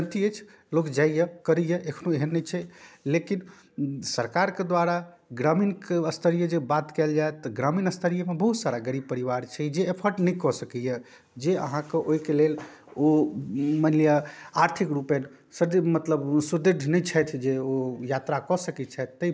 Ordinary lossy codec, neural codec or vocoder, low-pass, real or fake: none; none; none; real